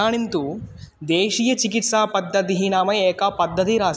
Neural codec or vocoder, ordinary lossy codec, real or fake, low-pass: none; none; real; none